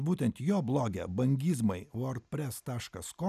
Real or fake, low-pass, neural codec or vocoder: real; 14.4 kHz; none